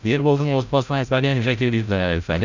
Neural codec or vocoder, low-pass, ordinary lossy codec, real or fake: codec, 16 kHz, 0.5 kbps, FreqCodec, larger model; 7.2 kHz; none; fake